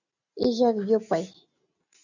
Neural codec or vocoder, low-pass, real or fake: none; 7.2 kHz; real